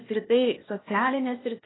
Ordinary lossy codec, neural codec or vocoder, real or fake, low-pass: AAC, 16 kbps; codec, 16 kHz, 0.8 kbps, ZipCodec; fake; 7.2 kHz